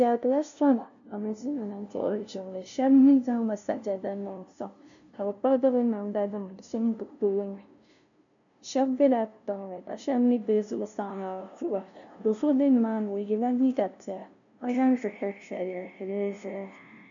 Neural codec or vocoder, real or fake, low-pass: codec, 16 kHz, 0.5 kbps, FunCodec, trained on LibriTTS, 25 frames a second; fake; 7.2 kHz